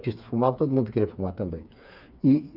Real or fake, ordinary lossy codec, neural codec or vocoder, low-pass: fake; none; codec, 16 kHz, 4 kbps, FreqCodec, smaller model; 5.4 kHz